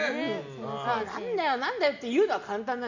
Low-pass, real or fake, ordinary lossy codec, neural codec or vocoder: 7.2 kHz; real; none; none